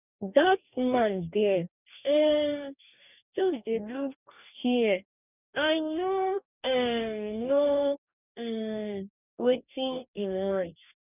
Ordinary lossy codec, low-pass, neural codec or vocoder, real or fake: none; 3.6 kHz; codec, 44.1 kHz, 2.6 kbps, DAC; fake